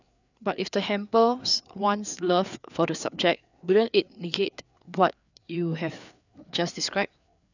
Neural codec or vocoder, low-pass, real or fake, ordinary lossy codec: codec, 16 kHz, 4 kbps, FreqCodec, larger model; 7.2 kHz; fake; none